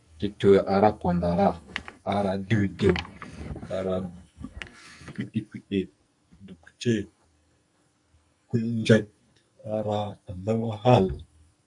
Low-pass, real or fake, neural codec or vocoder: 10.8 kHz; fake; codec, 44.1 kHz, 2.6 kbps, SNAC